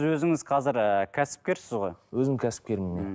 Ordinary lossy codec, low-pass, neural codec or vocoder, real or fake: none; none; none; real